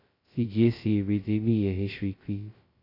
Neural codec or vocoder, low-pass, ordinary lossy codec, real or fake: codec, 16 kHz, 0.2 kbps, FocalCodec; 5.4 kHz; AAC, 24 kbps; fake